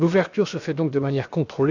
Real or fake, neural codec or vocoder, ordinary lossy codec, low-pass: fake; codec, 16 kHz, about 1 kbps, DyCAST, with the encoder's durations; none; 7.2 kHz